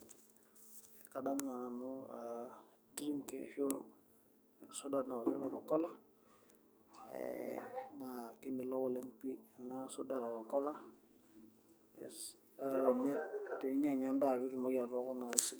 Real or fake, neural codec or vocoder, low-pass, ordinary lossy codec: fake; codec, 44.1 kHz, 2.6 kbps, SNAC; none; none